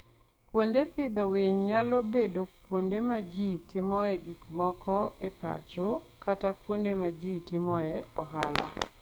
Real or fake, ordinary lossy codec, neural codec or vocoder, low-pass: fake; none; codec, 44.1 kHz, 2.6 kbps, SNAC; none